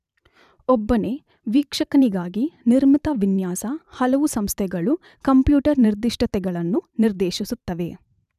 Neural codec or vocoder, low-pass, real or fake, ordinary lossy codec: vocoder, 44.1 kHz, 128 mel bands every 512 samples, BigVGAN v2; 14.4 kHz; fake; none